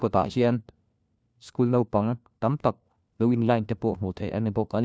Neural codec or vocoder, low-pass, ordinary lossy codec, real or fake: codec, 16 kHz, 1 kbps, FunCodec, trained on LibriTTS, 50 frames a second; none; none; fake